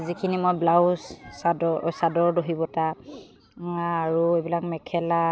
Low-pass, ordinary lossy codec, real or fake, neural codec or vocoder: none; none; real; none